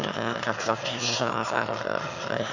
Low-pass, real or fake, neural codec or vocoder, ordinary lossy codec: 7.2 kHz; fake; autoencoder, 22.05 kHz, a latent of 192 numbers a frame, VITS, trained on one speaker; none